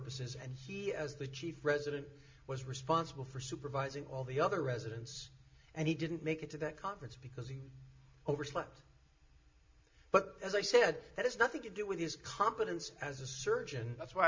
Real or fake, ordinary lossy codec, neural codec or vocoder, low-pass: real; MP3, 48 kbps; none; 7.2 kHz